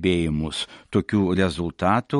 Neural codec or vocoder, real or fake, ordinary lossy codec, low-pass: none; real; MP3, 48 kbps; 19.8 kHz